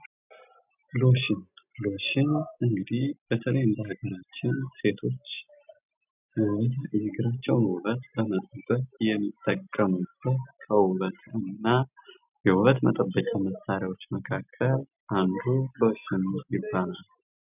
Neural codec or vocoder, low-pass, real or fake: none; 3.6 kHz; real